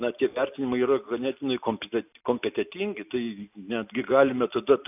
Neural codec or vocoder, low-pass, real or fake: none; 3.6 kHz; real